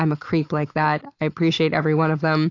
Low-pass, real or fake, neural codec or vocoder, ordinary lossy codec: 7.2 kHz; real; none; AAC, 48 kbps